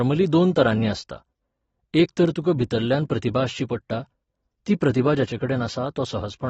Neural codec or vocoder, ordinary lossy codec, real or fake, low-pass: none; AAC, 24 kbps; real; 19.8 kHz